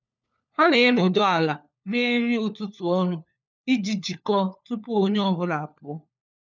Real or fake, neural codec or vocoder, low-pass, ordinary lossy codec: fake; codec, 16 kHz, 4 kbps, FunCodec, trained on LibriTTS, 50 frames a second; 7.2 kHz; none